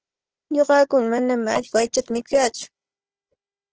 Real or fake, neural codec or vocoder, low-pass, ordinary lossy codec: fake; codec, 16 kHz, 4 kbps, FunCodec, trained on Chinese and English, 50 frames a second; 7.2 kHz; Opus, 16 kbps